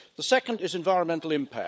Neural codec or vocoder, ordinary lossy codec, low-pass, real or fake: codec, 16 kHz, 16 kbps, FunCodec, trained on LibriTTS, 50 frames a second; none; none; fake